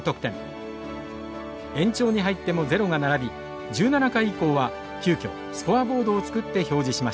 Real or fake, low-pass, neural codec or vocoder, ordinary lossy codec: real; none; none; none